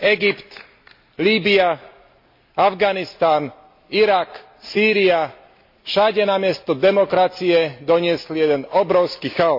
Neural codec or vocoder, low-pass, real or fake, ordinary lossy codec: none; 5.4 kHz; real; MP3, 32 kbps